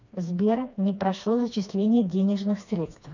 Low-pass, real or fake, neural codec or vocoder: 7.2 kHz; fake; codec, 16 kHz, 2 kbps, FreqCodec, smaller model